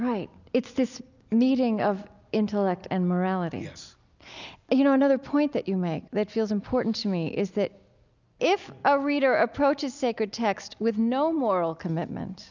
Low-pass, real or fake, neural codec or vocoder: 7.2 kHz; real; none